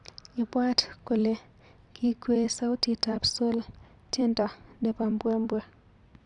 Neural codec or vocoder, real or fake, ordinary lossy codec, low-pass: vocoder, 44.1 kHz, 128 mel bands every 256 samples, BigVGAN v2; fake; none; 10.8 kHz